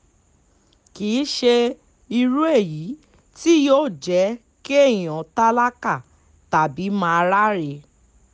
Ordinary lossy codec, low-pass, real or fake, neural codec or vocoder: none; none; real; none